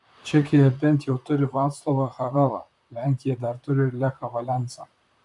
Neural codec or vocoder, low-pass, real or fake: vocoder, 44.1 kHz, 128 mel bands, Pupu-Vocoder; 10.8 kHz; fake